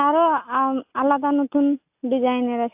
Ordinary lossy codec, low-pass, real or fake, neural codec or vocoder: AAC, 32 kbps; 3.6 kHz; real; none